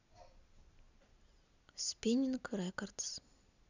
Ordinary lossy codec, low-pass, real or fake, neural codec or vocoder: none; 7.2 kHz; real; none